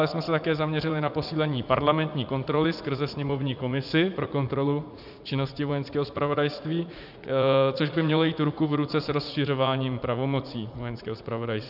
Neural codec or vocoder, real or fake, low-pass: vocoder, 44.1 kHz, 80 mel bands, Vocos; fake; 5.4 kHz